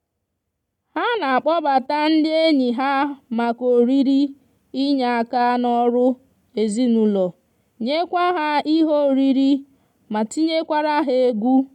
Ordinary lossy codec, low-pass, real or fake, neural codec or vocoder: MP3, 96 kbps; 19.8 kHz; real; none